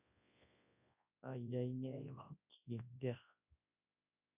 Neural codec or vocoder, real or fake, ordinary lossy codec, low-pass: codec, 24 kHz, 0.9 kbps, WavTokenizer, large speech release; fake; none; 3.6 kHz